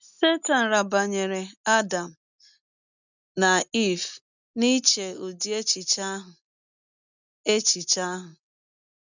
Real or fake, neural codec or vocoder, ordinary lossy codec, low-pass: real; none; none; 7.2 kHz